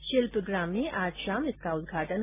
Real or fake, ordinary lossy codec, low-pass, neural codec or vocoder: real; AAC, 24 kbps; 3.6 kHz; none